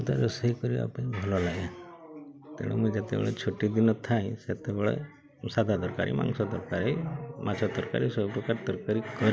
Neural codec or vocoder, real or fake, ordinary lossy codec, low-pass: none; real; none; none